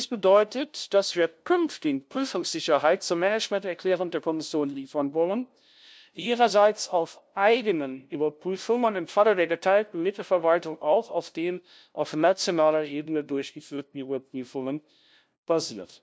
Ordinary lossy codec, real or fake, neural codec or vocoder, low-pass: none; fake; codec, 16 kHz, 0.5 kbps, FunCodec, trained on LibriTTS, 25 frames a second; none